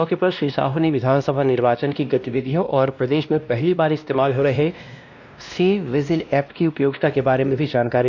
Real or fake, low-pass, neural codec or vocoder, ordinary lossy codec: fake; 7.2 kHz; codec, 16 kHz, 1 kbps, X-Codec, WavLM features, trained on Multilingual LibriSpeech; none